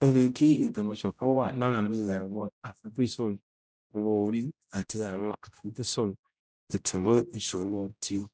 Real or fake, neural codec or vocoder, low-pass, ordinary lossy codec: fake; codec, 16 kHz, 0.5 kbps, X-Codec, HuBERT features, trained on general audio; none; none